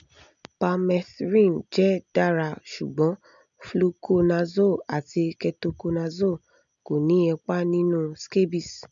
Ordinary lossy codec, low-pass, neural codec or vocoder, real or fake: MP3, 64 kbps; 7.2 kHz; none; real